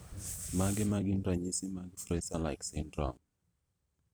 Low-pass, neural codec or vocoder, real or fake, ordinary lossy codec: none; vocoder, 44.1 kHz, 128 mel bands every 512 samples, BigVGAN v2; fake; none